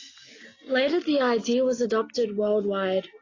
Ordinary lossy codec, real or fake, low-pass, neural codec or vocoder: AAC, 32 kbps; real; 7.2 kHz; none